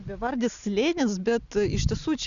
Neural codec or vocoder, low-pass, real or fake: none; 7.2 kHz; real